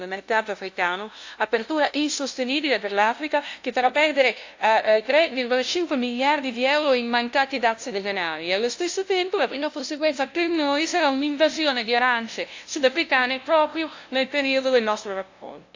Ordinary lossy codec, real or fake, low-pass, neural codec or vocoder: AAC, 48 kbps; fake; 7.2 kHz; codec, 16 kHz, 0.5 kbps, FunCodec, trained on LibriTTS, 25 frames a second